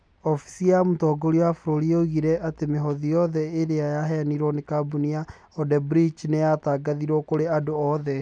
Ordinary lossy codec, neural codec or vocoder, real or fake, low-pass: none; none; real; 9.9 kHz